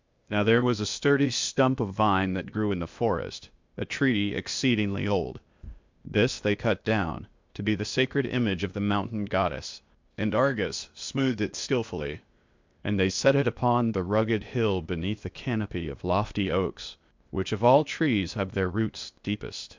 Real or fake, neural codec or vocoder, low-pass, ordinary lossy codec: fake; codec, 16 kHz, 0.8 kbps, ZipCodec; 7.2 kHz; MP3, 64 kbps